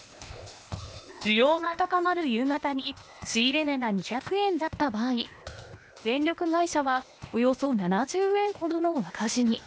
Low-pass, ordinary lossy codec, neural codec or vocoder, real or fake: none; none; codec, 16 kHz, 0.8 kbps, ZipCodec; fake